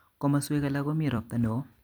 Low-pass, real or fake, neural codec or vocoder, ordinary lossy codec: none; fake; vocoder, 44.1 kHz, 128 mel bands every 256 samples, BigVGAN v2; none